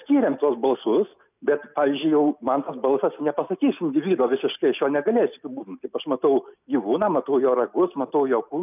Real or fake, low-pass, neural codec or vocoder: real; 3.6 kHz; none